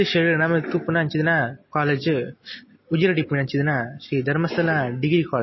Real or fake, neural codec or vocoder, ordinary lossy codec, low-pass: real; none; MP3, 24 kbps; 7.2 kHz